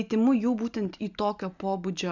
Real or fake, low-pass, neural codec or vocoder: real; 7.2 kHz; none